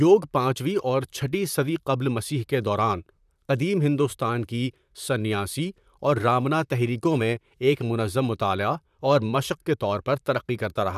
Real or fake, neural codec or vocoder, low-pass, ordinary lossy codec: fake; vocoder, 44.1 kHz, 128 mel bands, Pupu-Vocoder; 14.4 kHz; none